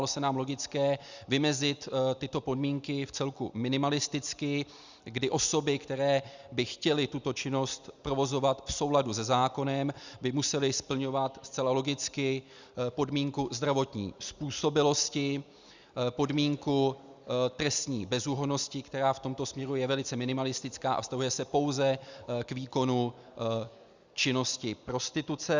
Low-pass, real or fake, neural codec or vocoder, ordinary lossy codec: 7.2 kHz; real; none; Opus, 64 kbps